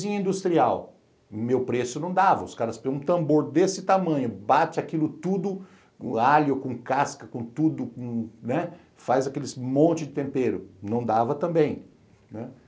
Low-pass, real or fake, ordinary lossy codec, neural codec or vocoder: none; real; none; none